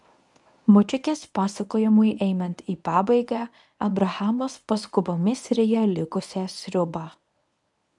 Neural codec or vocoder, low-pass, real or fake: codec, 24 kHz, 0.9 kbps, WavTokenizer, medium speech release version 1; 10.8 kHz; fake